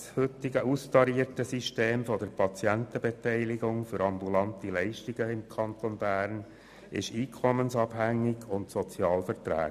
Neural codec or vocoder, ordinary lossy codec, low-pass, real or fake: none; none; 14.4 kHz; real